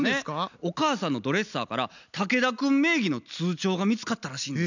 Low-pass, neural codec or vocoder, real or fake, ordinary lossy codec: 7.2 kHz; none; real; none